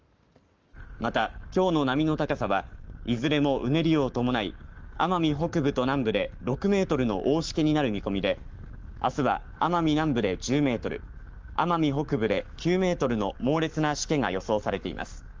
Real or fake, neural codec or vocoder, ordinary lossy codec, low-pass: fake; codec, 44.1 kHz, 7.8 kbps, Pupu-Codec; Opus, 24 kbps; 7.2 kHz